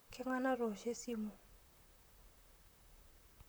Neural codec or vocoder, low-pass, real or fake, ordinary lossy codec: vocoder, 44.1 kHz, 128 mel bands, Pupu-Vocoder; none; fake; none